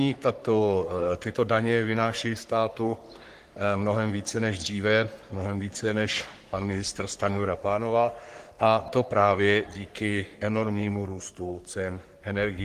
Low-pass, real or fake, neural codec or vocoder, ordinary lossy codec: 14.4 kHz; fake; codec, 44.1 kHz, 3.4 kbps, Pupu-Codec; Opus, 24 kbps